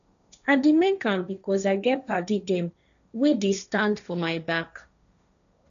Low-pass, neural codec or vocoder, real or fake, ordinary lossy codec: 7.2 kHz; codec, 16 kHz, 1.1 kbps, Voila-Tokenizer; fake; none